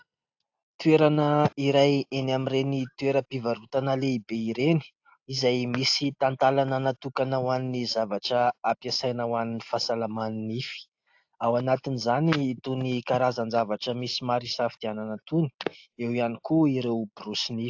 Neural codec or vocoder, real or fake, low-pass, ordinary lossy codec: none; real; 7.2 kHz; AAC, 48 kbps